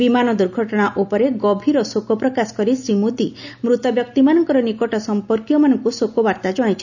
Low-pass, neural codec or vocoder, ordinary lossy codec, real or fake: 7.2 kHz; none; none; real